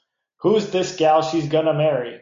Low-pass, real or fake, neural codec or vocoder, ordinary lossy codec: 7.2 kHz; real; none; MP3, 48 kbps